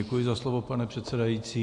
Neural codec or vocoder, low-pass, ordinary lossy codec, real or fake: none; 10.8 kHz; AAC, 64 kbps; real